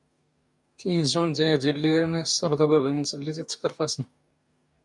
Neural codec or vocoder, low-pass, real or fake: codec, 44.1 kHz, 2.6 kbps, DAC; 10.8 kHz; fake